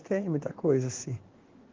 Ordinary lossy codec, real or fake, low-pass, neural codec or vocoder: Opus, 16 kbps; fake; 7.2 kHz; codec, 16 kHz in and 24 kHz out, 1 kbps, XY-Tokenizer